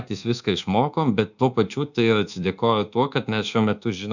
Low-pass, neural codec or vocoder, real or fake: 7.2 kHz; codec, 24 kHz, 1.2 kbps, DualCodec; fake